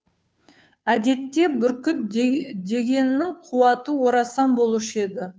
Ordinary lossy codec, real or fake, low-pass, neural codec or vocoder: none; fake; none; codec, 16 kHz, 2 kbps, FunCodec, trained on Chinese and English, 25 frames a second